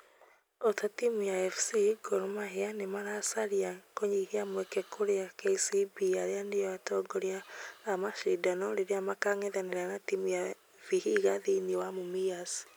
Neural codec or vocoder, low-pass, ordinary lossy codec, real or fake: none; none; none; real